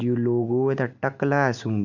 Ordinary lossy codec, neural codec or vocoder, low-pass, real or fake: none; none; 7.2 kHz; real